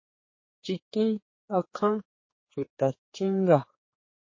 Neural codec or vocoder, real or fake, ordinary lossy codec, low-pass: codec, 16 kHz, 4 kbps, X-Codec, HuBERT features, trained on general audio; fake; MP3, 32 kbps; 7.2 kHz